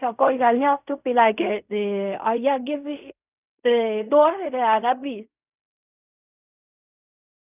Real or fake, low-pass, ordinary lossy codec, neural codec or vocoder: fake; 3.6 kHz; none; codec, 16 kHz in and 24 kHz out, 0.4 kbps, LongCat-Audio-Codec, fine tuned four codebook decoder